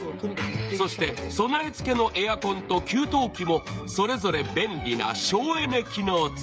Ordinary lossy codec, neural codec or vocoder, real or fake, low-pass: none; codec, 16 kHz, 16 kbps, FreqCodec, smaller model; fake; none